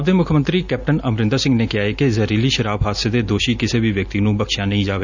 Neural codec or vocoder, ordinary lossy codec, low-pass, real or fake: none; none; 7.2 kHz; real